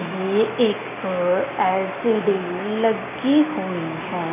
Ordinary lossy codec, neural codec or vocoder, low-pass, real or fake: MP3, 16 kbps; none; 3.6 kHz; real